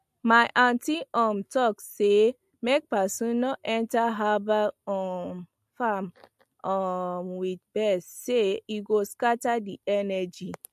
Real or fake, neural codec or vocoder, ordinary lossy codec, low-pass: real; none; MP3, 64 kbps; 14.4 kHz